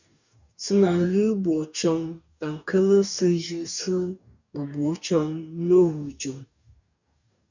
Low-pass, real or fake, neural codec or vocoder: 7.2 kHz; fake; codec, 44.1 kHz, 2.6 kbps, DAC